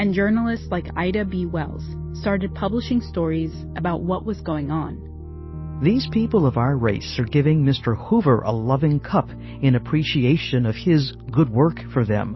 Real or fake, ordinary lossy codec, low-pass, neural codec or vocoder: real; MP3, 24 kbps; 7.2 kHz; none